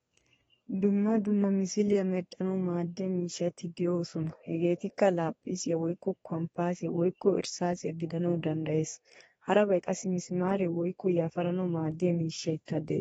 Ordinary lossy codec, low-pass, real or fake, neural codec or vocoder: AAC, 24 kbps; 14.4 kHz; fake; codec, 32 kHz, 1.9 kbps, SNAC